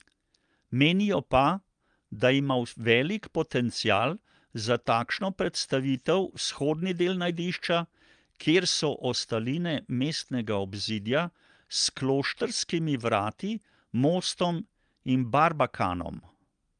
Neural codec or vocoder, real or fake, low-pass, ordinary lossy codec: none; real; 9.9 kHz; Opus, 32 kbps